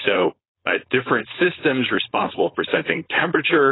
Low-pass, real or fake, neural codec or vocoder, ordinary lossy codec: 7.2 kHz; fake; codec, 16 kHz, 4.8 kbps, FACodec; AAC, 16 kbps